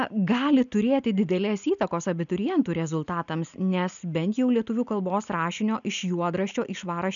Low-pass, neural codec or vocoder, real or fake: 7.2 kHz; none; real